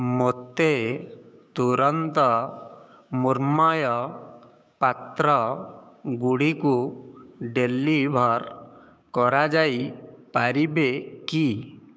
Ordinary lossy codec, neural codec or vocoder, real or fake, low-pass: none; codec, 16 kHz, 6 kbps, DAC; fake; none